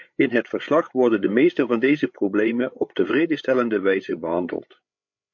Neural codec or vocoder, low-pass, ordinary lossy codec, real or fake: codec, 16 kHz, 8 kbps, FreqCodec, larger model; 7.2 kHz; MP3, 48 kbps; fake